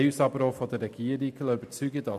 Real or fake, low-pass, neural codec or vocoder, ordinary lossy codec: real; 14.4 kHz; none; MP3, 96 kbps